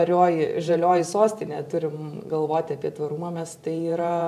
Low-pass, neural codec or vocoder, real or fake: 14.4 kHz; vocoder, 44.1 kHz, 128 mel bands every 512 samples, BigVGAN v2; fake